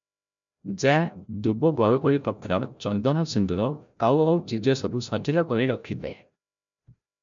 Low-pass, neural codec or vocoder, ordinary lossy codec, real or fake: 7.2 kHz; codec, 16 kHz, 0.5 kbps, FreqCodec, larger model; MP3, 64 kbps; fake